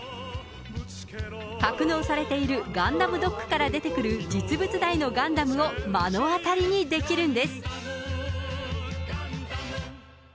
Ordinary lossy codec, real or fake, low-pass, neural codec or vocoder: none; real; none; none